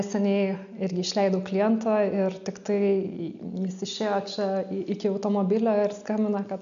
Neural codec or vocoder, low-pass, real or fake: none; 7.2 kHz; real